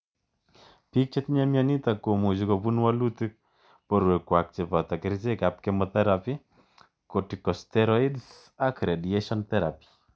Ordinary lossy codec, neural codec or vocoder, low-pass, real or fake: none; none; none; real